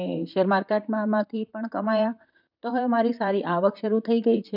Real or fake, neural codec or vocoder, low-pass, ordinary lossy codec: fake; vocoder, 44.1 kHz, 128 mel bands, Pupu-Vocoder; 5.4 kHz; none